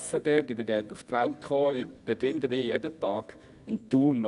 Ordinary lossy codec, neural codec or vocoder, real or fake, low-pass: none; codec, 24 kHz, 0.9 kbps, WavTokenizer, medium music audio release; fake; 10.8 kHz